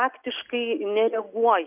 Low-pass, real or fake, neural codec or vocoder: 3.6 kHz; real; none